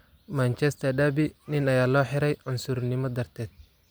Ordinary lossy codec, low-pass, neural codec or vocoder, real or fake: none; none; none; real